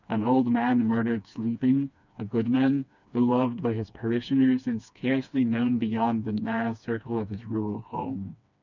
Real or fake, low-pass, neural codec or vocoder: fake; 7.2 kHz; codec, 16 kHz, 2 kbps, FreqCodec, smaller model